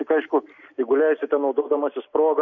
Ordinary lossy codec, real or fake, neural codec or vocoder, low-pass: MP3, 48 kbps; real; none; 7.2 kHz